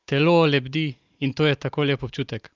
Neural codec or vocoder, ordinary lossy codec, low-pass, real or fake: none; Opus, 32 kbps; 7.2 kHz; real